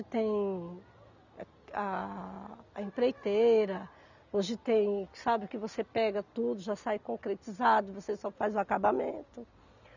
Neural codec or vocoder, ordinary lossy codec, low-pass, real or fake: none; none; 7.2 kHz; real